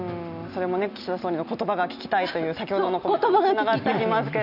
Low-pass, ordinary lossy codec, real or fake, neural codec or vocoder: 5.4 kHz; none; real; none